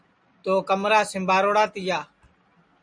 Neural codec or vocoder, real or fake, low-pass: none; real; 9.9 kHz